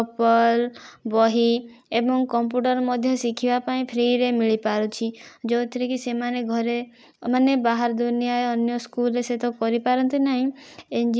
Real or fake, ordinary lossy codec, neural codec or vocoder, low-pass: real; none; none; none